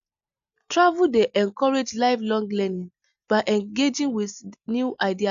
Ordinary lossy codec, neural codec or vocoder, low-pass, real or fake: none; none; 7.2 kHz; real